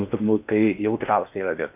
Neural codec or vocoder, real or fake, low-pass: codec, 16 kHz in and 24 kHz out, 0.8 kbps, FocalCodec, streaming, 65536 codes; fake; 3.6 kHz